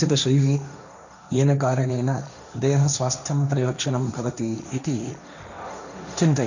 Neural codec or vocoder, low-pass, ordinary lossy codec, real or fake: codec, 16 kHz, 1.1 kbps, Voila-Tokenizer; 7.2 kHz; none; fake